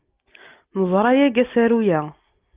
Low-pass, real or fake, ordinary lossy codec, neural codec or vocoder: 3.6 kHz; real; Opus, 24 kbps; none